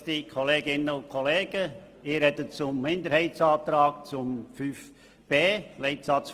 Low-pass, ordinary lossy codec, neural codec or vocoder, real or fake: 14.4 kHz; Opus, 24 kbps; none; real